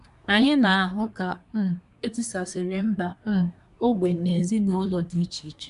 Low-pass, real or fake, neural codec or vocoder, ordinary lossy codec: 10.8 kHz; fake; codec, 24 kHz, 1 kbps, SNAC; none